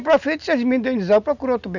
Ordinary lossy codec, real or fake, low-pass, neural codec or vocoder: none; real; 7.2 kHz; none